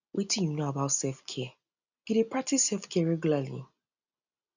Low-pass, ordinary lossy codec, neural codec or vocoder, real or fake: 7.2 kHz; none; none; real